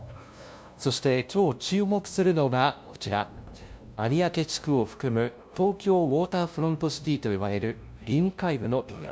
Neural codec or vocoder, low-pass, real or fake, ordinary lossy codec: codec, 16 kHz, 0.5 kbps, FunCodec, trained on LibriTTS, 25 frames a second; none; fake; none